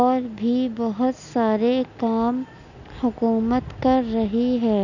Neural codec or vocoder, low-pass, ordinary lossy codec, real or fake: none; 7.2 kHz; none; real